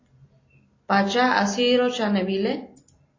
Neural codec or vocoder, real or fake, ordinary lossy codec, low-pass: none; real; AAC, 32 kbps; 7.2 kHz